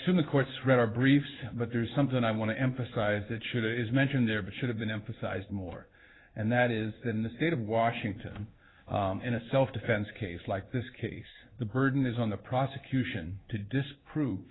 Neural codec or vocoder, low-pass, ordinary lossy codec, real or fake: codec, 16 kHz in and 24 kHz out, 1 kbps, XY-Tokenizer; 7.2 kHz; AAC, 16 kbps; fake